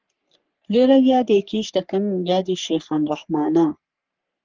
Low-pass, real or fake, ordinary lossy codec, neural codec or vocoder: 7.2 kHz; fake; Opus, 32 kbps; codec, 44.1 kHz, 3.4 kbps, Pupu-Codec